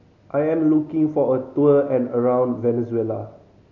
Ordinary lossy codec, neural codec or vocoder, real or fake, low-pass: none; none; real; 7.2 kHz